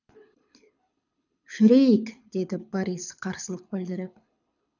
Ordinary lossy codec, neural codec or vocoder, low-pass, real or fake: none; codec, 24 kHz, 6 kbps, HILCodec; 7.2 kHz; fake